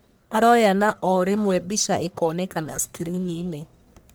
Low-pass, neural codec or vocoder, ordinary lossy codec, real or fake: none; codec, 44.1 kHz, 1.7 kbps, Pupu-Codec; none; fake